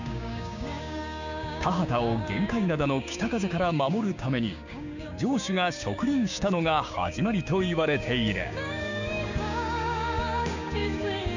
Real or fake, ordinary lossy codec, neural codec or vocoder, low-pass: fake; none; codec, 16 kHz, 6 kbps, DAC; 7.2 kHz